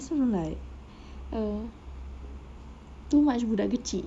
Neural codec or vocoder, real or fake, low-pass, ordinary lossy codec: none; real; none; none